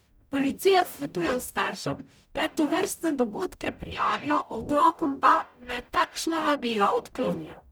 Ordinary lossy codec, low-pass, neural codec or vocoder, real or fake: none; none; codec, 44.1 kHz, 0.9 kbps, DAC; fake